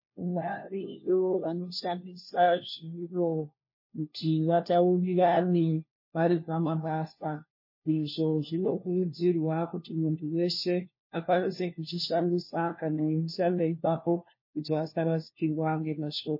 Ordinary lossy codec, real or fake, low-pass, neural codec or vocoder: MP3, 24 kbps; fake; 5.4 kHz; codec, 16 kHz, 1 kbps, FunCodec, trained on LibriTTS, 50 frames a second